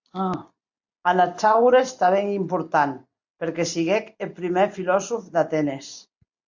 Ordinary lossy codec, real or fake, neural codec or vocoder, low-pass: MP3, 64 kbps; real; none; 7.2 kHz